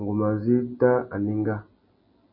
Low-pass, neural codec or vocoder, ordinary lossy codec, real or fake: 5.4 kHz; none; MP3, 24 kbps; real